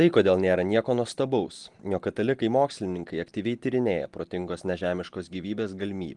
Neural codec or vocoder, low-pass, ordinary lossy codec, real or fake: none; 10.8 kHz; Opus, 24 kbps; real